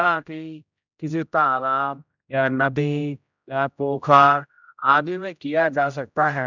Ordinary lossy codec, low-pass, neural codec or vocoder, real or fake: none; 7.2 kHz; codec, 16 kHz, 0.5 kbps, X-Codec, HuBERT features, trained on general audio; fake